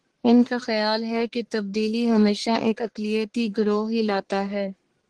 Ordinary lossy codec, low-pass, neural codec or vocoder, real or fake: Opus, 16 kbps; 10.8 kHz; codec, 44.1 kHz, 1.7 kbps, Pupu-Codec; fake